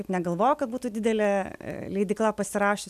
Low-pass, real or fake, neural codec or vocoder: 14.4 kHz; real; none